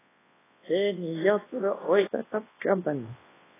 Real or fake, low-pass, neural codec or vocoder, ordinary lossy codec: fake; 3.6 kHz; codec, 24 kHz, 0.9 kbps, WavTokenizer, large speech release; AAC, 16 kbps